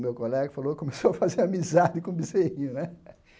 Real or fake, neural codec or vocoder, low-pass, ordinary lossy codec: real; none; none; none